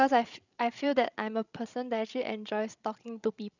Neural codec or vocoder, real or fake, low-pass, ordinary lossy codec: codec, 16 kHz, 16 kbps, FreqCodec, larger model; fake; 7.2 kHz; none